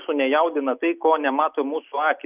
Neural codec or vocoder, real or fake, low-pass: none; real; 3.6 kHz